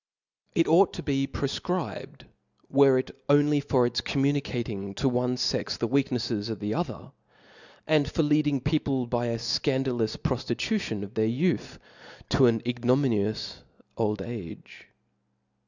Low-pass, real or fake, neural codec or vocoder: 7.2 kHz; real; none